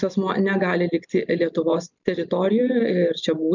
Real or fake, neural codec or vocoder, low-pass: real; none; 7.2 kHz